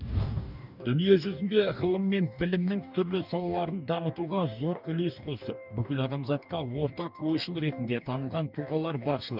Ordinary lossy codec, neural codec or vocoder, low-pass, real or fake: none; codec, 44.1 kHz, 2.6 kbps, DAC; 5.4 kHz; fake